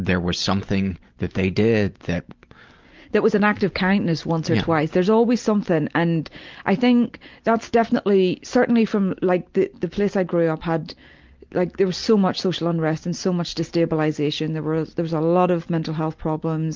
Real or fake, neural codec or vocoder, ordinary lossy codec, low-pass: real; none; Opus, 24 kbps; 7.2 kHz